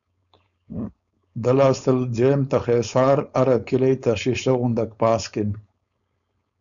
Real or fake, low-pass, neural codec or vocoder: fake; 7.2 kHz; codec, 16 kHz, 4.8 kbps, FACodec